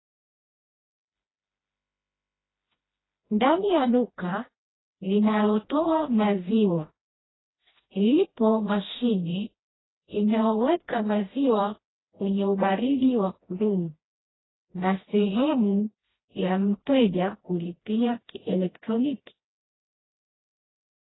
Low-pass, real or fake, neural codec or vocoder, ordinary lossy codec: 7.2 kHz; fake; codec, 16 kHz, 1 kbps, FreqCodec, smaller model; AAC, 16 kbps